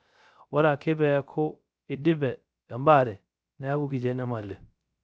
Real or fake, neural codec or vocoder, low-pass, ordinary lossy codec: fake; codec, 16 kHz, 0.3 kbps, FocalCodec; none; none